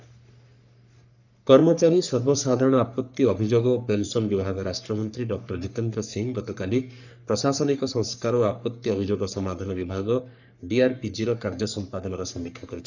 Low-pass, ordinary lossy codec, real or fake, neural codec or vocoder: 7.2 kHz; none; fake; codec, 44.1 kHz, 3.4 kbps, Pupu-Codec